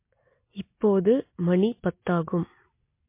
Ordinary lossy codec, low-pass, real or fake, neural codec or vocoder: MP3, 24 kbps; 3.6 kHz; real; none